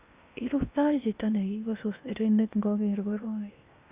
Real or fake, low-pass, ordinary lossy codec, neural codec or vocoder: fake; 3.6 kHz; Opus, 64 kbps; codec, 16 kHz in and 24 kHz out, 0.8 kbps, FocalCodec, streaming, 65536 codes